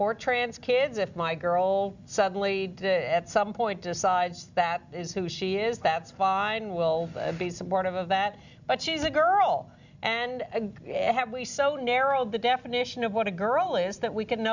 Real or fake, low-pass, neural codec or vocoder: real; 7.2 kHz; none